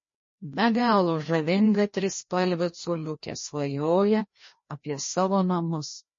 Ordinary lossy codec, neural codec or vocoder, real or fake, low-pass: MP3, 32 kbps; codec, 16 kHz, 1 kbps, FreqCodec, larger model; fake; 7.2 kHz